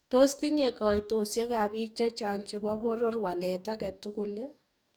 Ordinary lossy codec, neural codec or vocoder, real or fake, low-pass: none; codec, 44.1 kHz, 2.6 kbps, DAC; fake; none